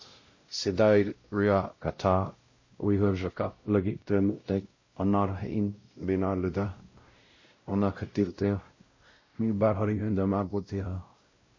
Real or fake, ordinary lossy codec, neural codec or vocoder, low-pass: fake; MP3, 32 kbps; codec, 16 kHz, 0.5 kbps, X-Codec, WavLM features, trained on Multilingual LibriSpeech; 7.2 kHz